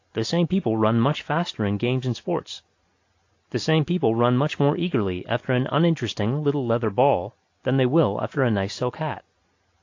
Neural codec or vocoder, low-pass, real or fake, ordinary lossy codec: none; 7.2 kHz; real; AAC, 48 kbps